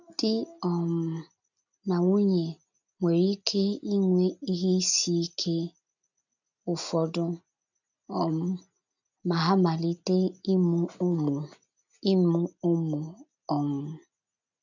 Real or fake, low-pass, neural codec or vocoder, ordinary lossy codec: real; 7.2 kHz; none; none